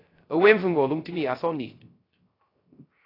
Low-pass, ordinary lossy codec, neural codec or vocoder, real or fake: 5.4 kHz; AAC, 24 kbps; codec, 16 kHz, 0.3 kbps, FocalCodec; fake